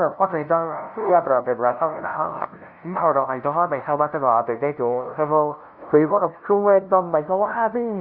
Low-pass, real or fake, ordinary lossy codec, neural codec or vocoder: 5.4 kHz; fake; none; codec, 16 kHz, 0.5 kbps, FunCodec, trained on LibriTTS, 25 frames a second